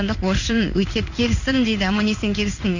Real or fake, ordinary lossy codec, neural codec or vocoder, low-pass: fake; none; codec, 16 kHz in and 24 kHz out, 1 kbps, XY-Tokenizer; 7.2 kHz